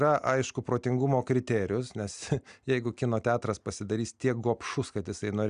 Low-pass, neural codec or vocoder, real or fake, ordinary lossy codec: 9.9 kHz; none; real; Opus, 64 kbps